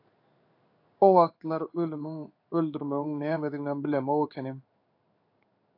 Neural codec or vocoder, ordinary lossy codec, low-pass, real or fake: codec, 16 kHz in and 24 kHz out, 1 kbps, XY-Tokenizer; AAC, 32 kbps; 5.4 kHz; fake